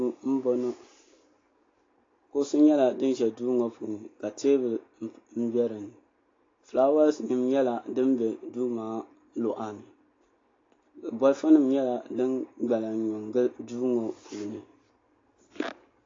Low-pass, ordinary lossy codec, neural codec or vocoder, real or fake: 7.2 kHz; AAC, 32 kbps; none; real